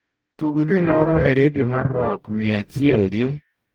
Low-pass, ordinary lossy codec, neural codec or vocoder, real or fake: 19.8 kHz; Opus, 24 kbps; codec, 44.1 kHz, 0.9 kbps, DAC; fake